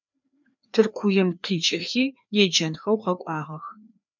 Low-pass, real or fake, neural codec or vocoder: 7.2 kHz; fake; codec, 16 kHz, 2 kbps, FreqCodec, larger model